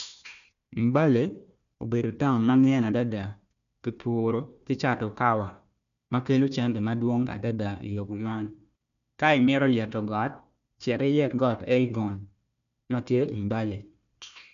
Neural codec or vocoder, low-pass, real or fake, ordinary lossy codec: codec, 16 kHz, 1 kbps, FunCodec, trained on Chinese and English, 50 frames a second; 7.2 kHz; fake; none